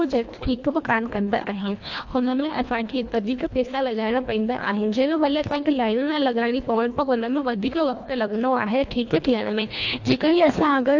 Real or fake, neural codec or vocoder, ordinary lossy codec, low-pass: fake; codec, 24 kHz, 1.5 kbps, HILCodec; AAC, 48 kbps; 7.2 kHz